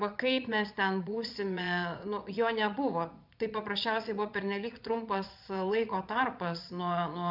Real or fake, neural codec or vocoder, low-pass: fake; vocoder, 22.05 kHz, 80 mel bands, Vocos; 5.4 kHz